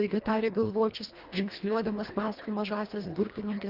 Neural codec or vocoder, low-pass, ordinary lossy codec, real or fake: codec, 24 kHz, 1.5 kbps, HILCodec; 5.4 kHz; Opus, 32 kbps; fake